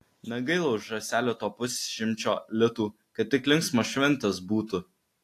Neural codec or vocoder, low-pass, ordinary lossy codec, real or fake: none; 14.4 kHz; AAC, 64 kbps; real